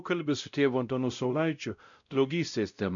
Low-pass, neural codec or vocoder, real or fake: 7.2 kHz; codec, 16 kHz, 0.5 kbps, X-Codec, WavLM features, trained on Multilingual LibriSpeech; fake